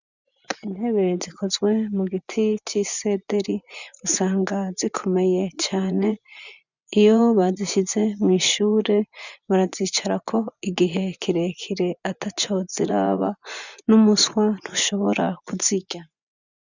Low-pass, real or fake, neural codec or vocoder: 7.2 kHz; fake; vocoder, 24 kHz, 100 mel bands, Vocos